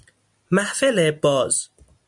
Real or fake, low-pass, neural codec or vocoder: real; 10.8 kHz; none